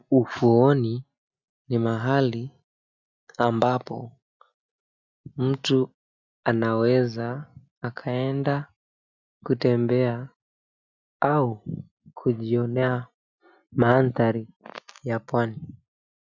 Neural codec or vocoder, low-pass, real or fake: none; 7.2 kHz; real